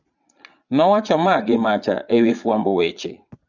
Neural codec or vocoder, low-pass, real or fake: vocoder, 22.05 kHz, 80 mel bands, Vocos; 7.2 kHz; fake